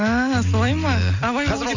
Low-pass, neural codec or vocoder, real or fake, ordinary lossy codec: 7.2 kHz; none; real; none